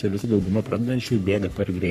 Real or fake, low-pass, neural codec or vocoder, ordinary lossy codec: fake; 14.4 kHz; codec, 44.1 kHz, 3.4 kbps, Pupu-Codec; MP3, 64 kbps